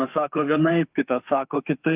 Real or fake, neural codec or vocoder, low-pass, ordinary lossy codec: fake; autoencoder, 48 kHz, 32 numbers a frame, DAC-VAE, trained on Japanese speech; 3.6 kHz; Opus, 24 kbps